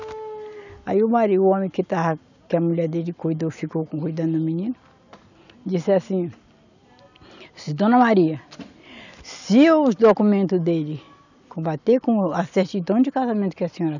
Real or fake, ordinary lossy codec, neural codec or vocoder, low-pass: real; none; none; 7.2 kHz